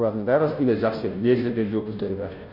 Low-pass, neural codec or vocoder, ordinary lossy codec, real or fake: 5.4 kHz; codec, 16 kHz, 0.5 kbps, FunCodec, trained on Chinese and English, 25 frames a second; none; fake